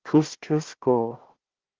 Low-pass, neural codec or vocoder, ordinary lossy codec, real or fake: 7.2 kHz; codec, 16 kHz, 1 kbps, FunCodec, trained on Chinese and English, 50 frames a second; Opus, 16 kbps; fake